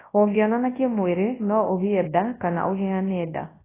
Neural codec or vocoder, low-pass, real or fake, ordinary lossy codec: codec, 24 kHz, 0.9 kbps, WavTokenizer, large speech release; 3.6 kHz; fake; AAC, 16 kbps